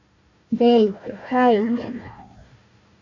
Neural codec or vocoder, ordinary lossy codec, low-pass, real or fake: codec, 16 kHz, 1 kbps, FunCodec, trained on Chinese and English, 50 frames a second; AAC, 48 kbps; 7.2 kHz; fake